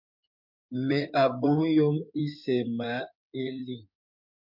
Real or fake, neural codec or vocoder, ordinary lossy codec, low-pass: fake; codec, 16 kHz in and 24 kHz out, 2.2 kbps, FireRedTTS-2 codec; MP3, 48 kbps; 5.4 kHz